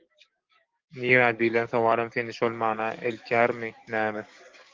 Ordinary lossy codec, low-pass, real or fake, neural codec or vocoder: Opus, 32 kbps; 7.2 kHz; fake; codec, 44.1 kHz, 7.8 kbps, DAC